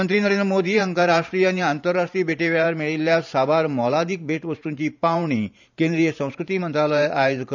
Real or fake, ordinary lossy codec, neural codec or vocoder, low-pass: fake; none; vocoder, 44.1 kHz, 128 mel bands every 512 samples, BigVGAN v2; 7.2 kHz